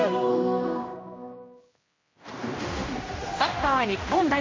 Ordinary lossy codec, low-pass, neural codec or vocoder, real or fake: MP3, 32 kbps; 7.2 kHz; codec, 16 kHz, 1 kbps, X-Codec, HuBERT features, trained on general audio; fake